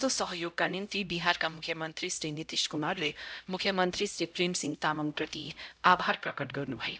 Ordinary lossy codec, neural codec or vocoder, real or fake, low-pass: none; codec, 16 kHz, 0.5 kbps, X-Codec, HuBERT features, trained on LibriSpeech; fake; none